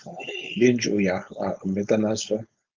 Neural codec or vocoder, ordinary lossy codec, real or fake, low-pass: codec, 16 kHz, 4.8 kbps, FACodec; Opus, 24 kbps; fake; 7.2 kHz